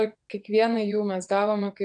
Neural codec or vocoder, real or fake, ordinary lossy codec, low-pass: vocoder, 22.05 kHz, 80 mel bands, WaveNeXt; fake; AAC, 64 kbps; 9.9 kHz